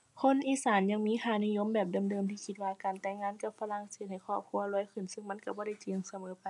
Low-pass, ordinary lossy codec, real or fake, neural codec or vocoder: 10.8 kHz; none; real; none